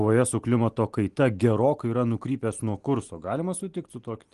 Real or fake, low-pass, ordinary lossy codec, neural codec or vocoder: real; 10.8 kHz; Opus, 24 kbps; none